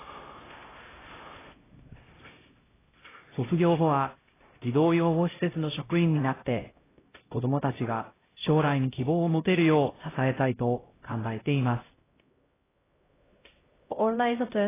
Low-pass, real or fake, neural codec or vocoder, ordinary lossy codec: 3.6 kHz; fake; codec, 16 kHz, 0.5 kbps, X-Codec, HuBERT features, trained on LibriSpeech; AAC, 16 kbps